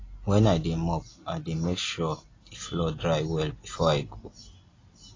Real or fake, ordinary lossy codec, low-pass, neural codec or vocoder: real; AAC, 32 kbps; 7.2 kHz; none